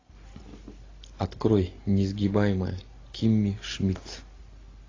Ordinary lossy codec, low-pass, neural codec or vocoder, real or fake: AAC, 32 kbps; 7.2 kHz; none; real